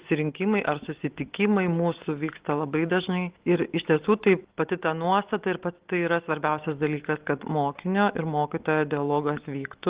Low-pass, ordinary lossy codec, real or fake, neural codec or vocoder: 3.6 kHz; Opus, 16 kbps; real; none